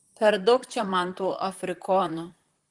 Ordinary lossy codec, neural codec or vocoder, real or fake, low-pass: Opus, 24 kbps; vocoder, 44.1 kHz, 128 mel bands, Pupu-Vocoder; fake; 10.8 kHz